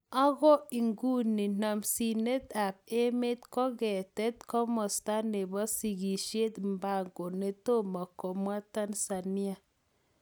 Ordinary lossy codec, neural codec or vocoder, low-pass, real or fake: none; none; none; real